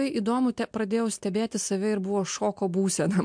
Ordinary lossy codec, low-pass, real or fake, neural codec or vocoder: MP3, 64 kbps; 9.9 kHz; real; none